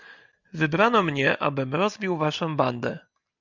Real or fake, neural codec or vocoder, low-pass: real; none; 7.2 kHz